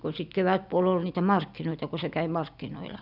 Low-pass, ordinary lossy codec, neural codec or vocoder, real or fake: 5.4 kHz; none; none; real